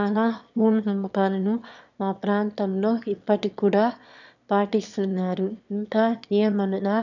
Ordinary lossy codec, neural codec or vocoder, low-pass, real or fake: none; autoencoder, 22.05 kHz, a latent of 192 numbers a frame, VITS, trained on one speaker; 7.2 kHz; fake